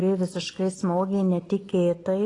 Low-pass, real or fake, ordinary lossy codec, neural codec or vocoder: 10.8 kHz; real; AAC, 32 kbps; none